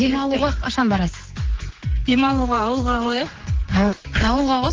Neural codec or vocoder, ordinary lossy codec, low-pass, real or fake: codec, 16 kHz, 4 kbps, X-Codec, HuBERT features, trained on general audio; Opus, 16 kbps; 7.2 kHz; fake